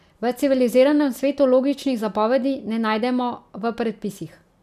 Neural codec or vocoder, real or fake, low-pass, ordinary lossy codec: none; real; 14.4 kHz; none